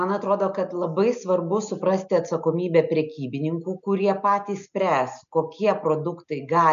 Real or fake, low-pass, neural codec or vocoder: real; 7.2 kHz; none